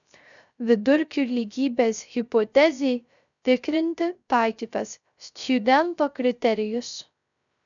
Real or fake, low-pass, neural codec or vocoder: fake; 7.2 kHz; codec, 16 kHz, 0.3 kbps, FocalCodec